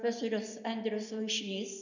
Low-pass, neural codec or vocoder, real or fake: 7.2 kHz; none; real